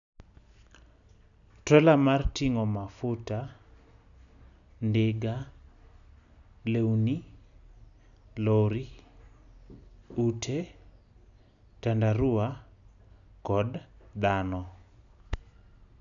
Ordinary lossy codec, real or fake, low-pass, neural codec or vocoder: none; real; 7.2 kHz; none